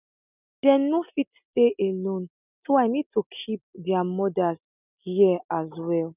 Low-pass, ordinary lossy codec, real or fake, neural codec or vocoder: 3.6 kHz; none; real; none